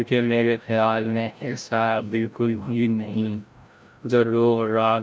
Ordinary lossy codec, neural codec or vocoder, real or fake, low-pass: none; codec, 16 kHz, 0.5 kbps, FreqCodec, larger model; fake; none